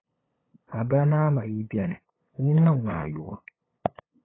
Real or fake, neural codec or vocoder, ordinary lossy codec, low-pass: fake; codec, 16 kHz, 8 kbps, FunCodec, trained on LibriTTS, 25 frames a second; AAC, 16 kbps; 7.2 kHz